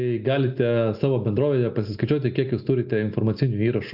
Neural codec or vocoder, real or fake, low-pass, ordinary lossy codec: none; real; 5.4 kHz; AAC, 48 kbps